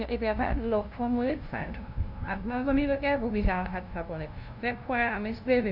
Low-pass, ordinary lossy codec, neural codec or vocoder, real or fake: 5.4 kHz; none; codec, 16 kHz, 0.5 kbps, FunCodec, trained on LibriTTS, 25 frames a second; fake